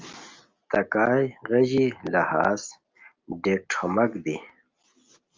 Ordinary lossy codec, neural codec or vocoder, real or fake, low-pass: Opus, 32 kbps; none; real; 7.2 kHz